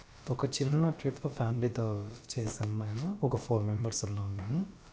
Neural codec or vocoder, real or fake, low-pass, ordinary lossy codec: codec, 16 kHz, about 1 kbps, DyCAST, with the encoder's durations; fake; none; none